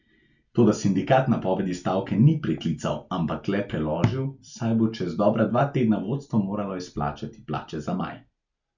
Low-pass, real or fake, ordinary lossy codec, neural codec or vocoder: 7.2 kHz; real; none; none